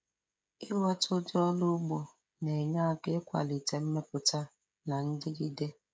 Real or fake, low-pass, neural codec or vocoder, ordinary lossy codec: fake; none; codec, 16 kHz, 16 kbps, FreqCodec, smaller model; none